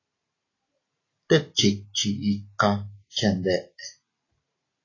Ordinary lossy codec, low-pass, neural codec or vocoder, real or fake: AAC, 32 kbps; 7.2 kHz; none; real